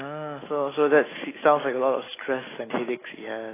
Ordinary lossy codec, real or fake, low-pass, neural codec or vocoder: AAC, 16 kbps; fake; 3.6 kHz; autoencoder, 48 kHz, 128 numbers a frame, DAC-VAE, trained on Japanese speech